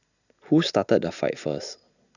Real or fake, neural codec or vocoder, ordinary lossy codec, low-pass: real; none; none; 7.2 kHz